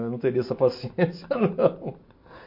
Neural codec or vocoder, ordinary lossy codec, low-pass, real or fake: none; MP3, 32 kbps; 5.4 kHz; real